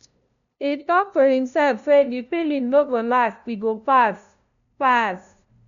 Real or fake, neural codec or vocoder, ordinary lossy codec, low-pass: fake; codec, 16 kHz, 0.5 kbps, FunCodec, trained on LibriTTS, 25 frames a second; none; 7.2 kHz